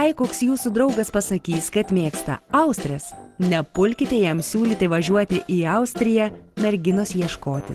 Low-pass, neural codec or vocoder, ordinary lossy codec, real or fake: 14.4 kHz; none; Opus, 16 kbps; real